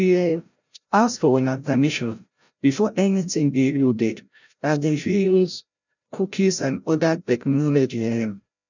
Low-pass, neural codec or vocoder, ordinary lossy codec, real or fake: 7.2 kHz; codec, 16 kHz, 0.5 kbps, FreqCodec, larger model; none; fake